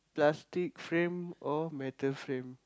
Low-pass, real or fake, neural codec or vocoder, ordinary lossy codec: none; real; none; none